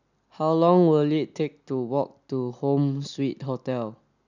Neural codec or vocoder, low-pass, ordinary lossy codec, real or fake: none; 7.2 kHz; none; real